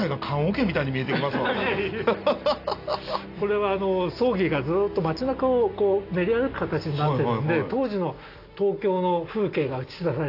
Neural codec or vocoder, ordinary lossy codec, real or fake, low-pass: none; AAC, 32 kbps; real; 5.4 kHz